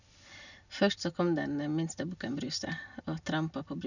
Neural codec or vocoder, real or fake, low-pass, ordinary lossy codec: none; real; 7.2 kHz; none